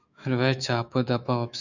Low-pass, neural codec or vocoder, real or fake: 7.2 kHz; autoencoder, 48 kHz, 128 numbers a frame, DAC-VAE, trained on Japanese speech; fake